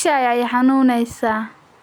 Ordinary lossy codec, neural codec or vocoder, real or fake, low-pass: none; none; real; none